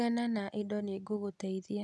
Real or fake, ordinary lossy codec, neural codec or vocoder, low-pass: real; none; none; none